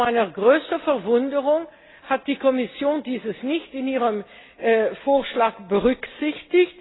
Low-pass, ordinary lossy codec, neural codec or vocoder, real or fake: 7.2 kHz; AAC, 16 kbps; none; real